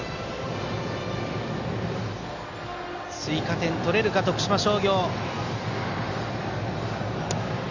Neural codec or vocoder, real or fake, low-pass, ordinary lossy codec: none; real; 7.2 kHz; Opus, 64 kbps